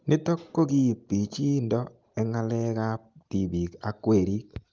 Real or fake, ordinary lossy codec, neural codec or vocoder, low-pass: real; Opus, 24 kbps; none; 7.2 kHz